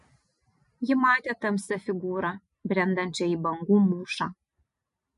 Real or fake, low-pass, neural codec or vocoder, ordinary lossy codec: real; 10.8 kHz; none; MP3, 48 kbps